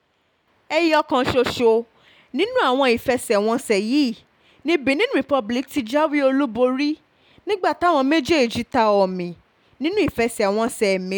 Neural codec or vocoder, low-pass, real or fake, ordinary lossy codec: none; 19.8 kHz; real; none